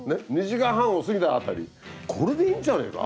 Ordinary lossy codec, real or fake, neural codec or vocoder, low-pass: none; real; none; none